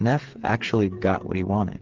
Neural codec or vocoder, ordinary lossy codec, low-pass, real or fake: codec, 16 kHz, 8 kbps, FreqCodec, smaller model; Opus, 16 kbps; 7.2 kHz; fake